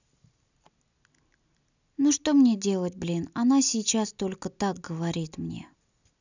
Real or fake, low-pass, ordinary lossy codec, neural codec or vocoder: real; 7.2 kHz; none; none